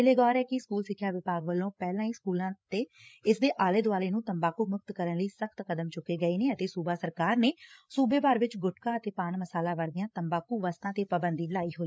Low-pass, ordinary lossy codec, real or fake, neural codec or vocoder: none; none; fake; codec, 16 kHz, 8 kbps, FreqCodec, larger model